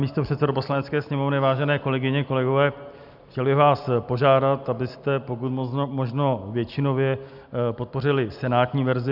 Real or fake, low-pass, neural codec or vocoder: real; 5.4 kHz; none